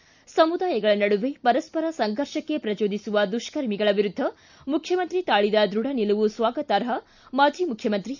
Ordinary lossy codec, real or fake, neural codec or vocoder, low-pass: none; real; none; 7.2 kHz